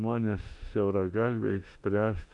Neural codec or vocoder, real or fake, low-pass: autoencoder, 48 kHz, 32 numbers a frame, DAC-VAE, trained on Japanese speech; fake; 10.8 kHz